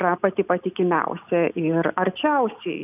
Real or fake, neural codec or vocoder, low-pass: real; none; 3.6 kHz